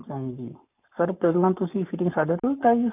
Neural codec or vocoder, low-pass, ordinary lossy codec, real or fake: codec, 44.1 kHz, 7.8 kbps, Pupu-Codec; 3.6 kHz; none; fake